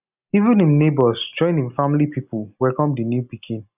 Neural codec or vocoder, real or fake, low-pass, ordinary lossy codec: none; real; 3.6 kHz; none